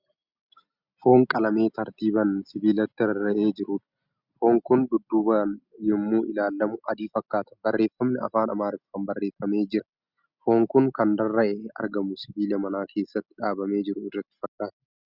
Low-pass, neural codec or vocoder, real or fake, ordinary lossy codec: 5.4 kHz; none; real; Opus, 64 kbps